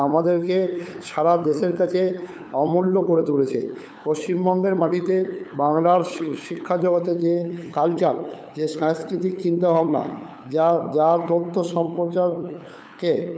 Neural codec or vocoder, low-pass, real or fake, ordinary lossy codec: codec, 16 kHz, 8 kbps, FunCodec, trained on LibriTTS, 25 frames a second; none; fake; none